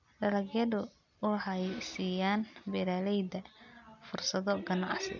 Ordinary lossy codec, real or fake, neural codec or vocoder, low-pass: none; real; none; 7.2 kHz